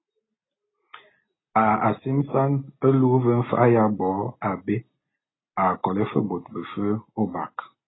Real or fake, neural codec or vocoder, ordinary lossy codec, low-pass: real; none; AAC, 16 kbps; 7.2 kHz